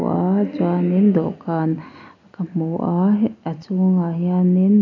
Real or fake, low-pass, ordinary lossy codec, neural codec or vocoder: real; 7.2 kHz; none; none